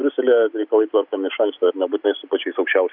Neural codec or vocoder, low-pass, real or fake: none; 5.4 kHz; real